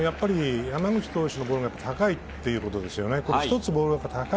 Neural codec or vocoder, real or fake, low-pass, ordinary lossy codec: none; real; none; none